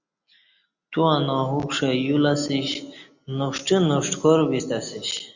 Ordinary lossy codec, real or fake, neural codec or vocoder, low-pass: Opus, 64 kbps; real; none; 7.2 kHz